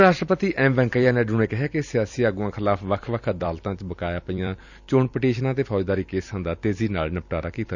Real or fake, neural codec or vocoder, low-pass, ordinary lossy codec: fake; vocoder, 44.1 kHz, 128 mel bands every 256 samples, BigVGAN v2; 7.2 kHz; none